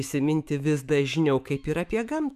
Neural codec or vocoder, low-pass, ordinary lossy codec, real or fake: autoencoder, 48 kHz, 128 numbers a frame, DAC-VAE, trained on Japanese speech; 14.4 kHz; MP3, 96 kbps; fake